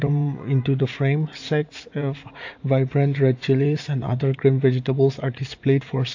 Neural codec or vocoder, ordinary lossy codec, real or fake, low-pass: vocoder, 44.1 kHz, 80 mel bands, Vocos; AAC, 48 kbps; fake; 7.2 kHz